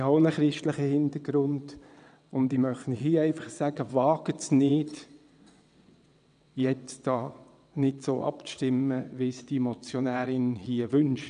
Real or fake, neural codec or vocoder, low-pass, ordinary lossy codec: fake; vocoder, 22.05 kHz, 80 mel bands, WaveNeXt; 9.9 kHz; none